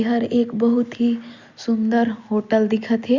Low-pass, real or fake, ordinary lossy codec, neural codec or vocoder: 7.2 kHz; real; Opus, 64 kbps; none